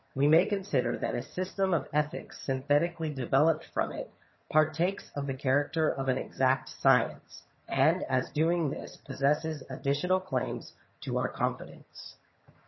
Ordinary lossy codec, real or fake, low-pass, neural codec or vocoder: MP3, 24 kbps; fake; 7.2 kHz; vocoder, 22.05 kHz, 80 mel bands, HiFi-GAN